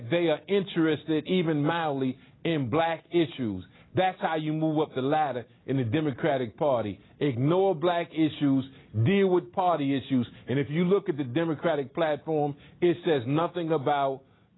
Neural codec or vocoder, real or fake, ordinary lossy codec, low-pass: none; real; AAC, 16 kbps; 7.2 kHz